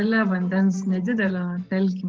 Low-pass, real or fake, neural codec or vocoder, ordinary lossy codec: 7.2 kHz; fake; vocoder, 24 kHz, 100 mel bands, Vocos; Opus, 16 kbps